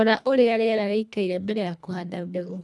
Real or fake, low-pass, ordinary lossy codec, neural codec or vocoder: fake; none; none; codec, 24 kHz, 1.5 kbps, HILCodec